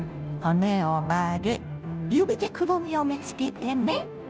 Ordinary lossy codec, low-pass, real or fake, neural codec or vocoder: none; none; fake; codec, 16 kHz, 0.5 kbps, FunCodec, trained on Chinese and English, 25 frames a second